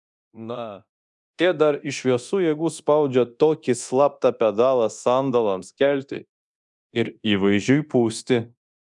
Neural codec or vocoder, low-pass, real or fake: codec, 24 kHz, 0.9 kbps, DualCodec; 10.8 kHz; fake